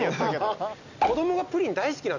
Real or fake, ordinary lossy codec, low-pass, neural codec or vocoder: real; none; 7.2 kHz; none